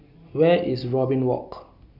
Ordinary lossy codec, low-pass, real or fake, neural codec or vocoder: none; 5.4 kHz; real; none